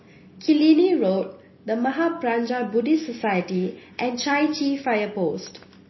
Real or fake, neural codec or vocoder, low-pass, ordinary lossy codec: real; none; 7.2 kHz; MP3, 24 kbps